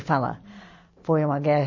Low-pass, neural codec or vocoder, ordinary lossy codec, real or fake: 7.2 kHz; none; MP3, 32 kbps; real